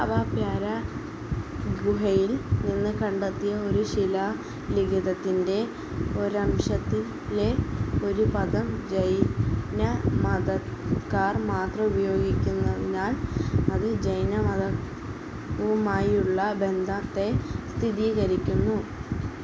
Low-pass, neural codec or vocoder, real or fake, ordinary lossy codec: none; none; real; none